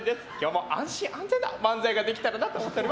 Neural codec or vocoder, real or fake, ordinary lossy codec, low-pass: none; real; none; none